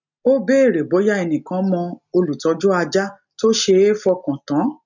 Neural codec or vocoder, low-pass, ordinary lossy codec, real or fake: none; 7.2 kHz; none; real